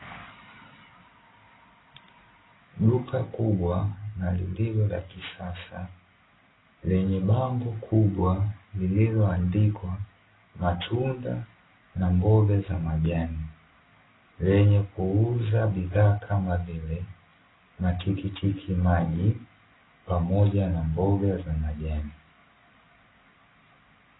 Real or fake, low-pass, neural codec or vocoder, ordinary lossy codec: real; 7.2 kHz; none; AAC, 16 kbps